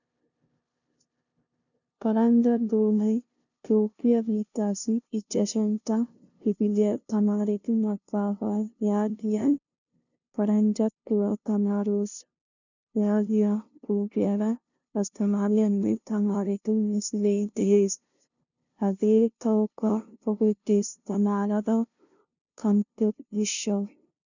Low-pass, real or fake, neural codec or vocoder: 7.2 kHz; fake; codec, 16 kHz, 0.5 kbps, FunCodec, trained on LibriTTS, 25 frames a second